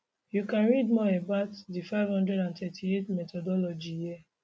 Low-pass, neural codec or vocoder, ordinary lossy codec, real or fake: none; none; none; real